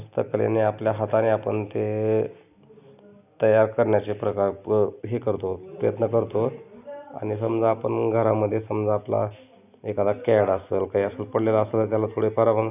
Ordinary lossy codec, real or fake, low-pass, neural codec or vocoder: none; real; 3.6 kHz; none